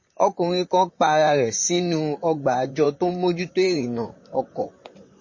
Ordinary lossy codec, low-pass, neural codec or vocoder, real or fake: MP3, 32 kbps; 7.2 kHz; vocoder, 44.1 kHz, 128 mel bands, Pupu-Vocoder; fake